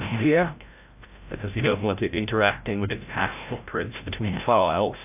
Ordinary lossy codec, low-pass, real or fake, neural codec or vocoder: AAC, 32 kbps; 3.6 kHz; fake; codec, 16 kHz, 0.5 kbps, FreqCodec, larger model